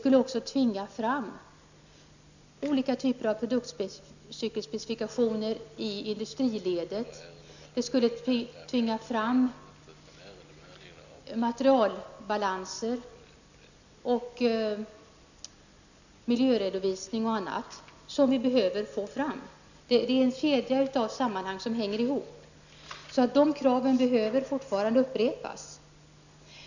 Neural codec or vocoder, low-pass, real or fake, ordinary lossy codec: none; 7.2 kHz; real; none